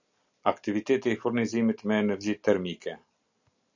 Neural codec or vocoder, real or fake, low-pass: none; real; 7.2 kHz